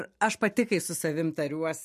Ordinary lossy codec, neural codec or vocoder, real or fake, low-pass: MP3, 64 kbps; none; real; 14.4 kHz